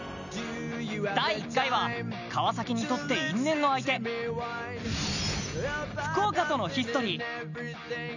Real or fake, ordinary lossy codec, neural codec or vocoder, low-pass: real; none; none; 7.2 kHz